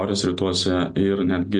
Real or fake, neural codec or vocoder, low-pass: real; none; 10.8 kHz